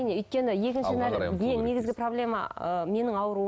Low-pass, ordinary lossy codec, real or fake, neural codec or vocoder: none; none; real; none